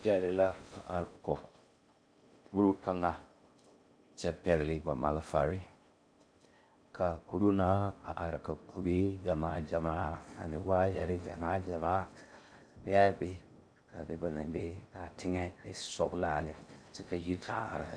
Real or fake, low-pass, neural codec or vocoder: fake; 9.9 kHz; codec, 16 kHz in and 24 kHz out, 0.6 kbps, FocalCodec, streaming, 2048 codes